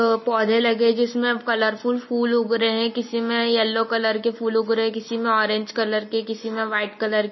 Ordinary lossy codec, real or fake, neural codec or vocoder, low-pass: MP3, 24 kbps; real; none; 7.2 kHz